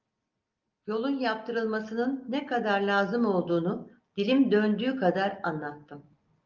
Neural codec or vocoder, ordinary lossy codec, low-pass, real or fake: none; Opus, 24 kbps; 7.2 kHz; real